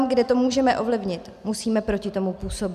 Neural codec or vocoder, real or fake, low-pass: none; real; 14.4 kHz